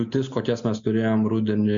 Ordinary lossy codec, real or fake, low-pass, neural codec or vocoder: MP3, 64 kbps; real; 7.2 kHz; none